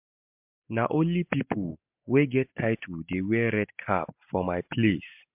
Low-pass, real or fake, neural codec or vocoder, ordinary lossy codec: 3.6 kHz; real; none; MP3, 32 kbps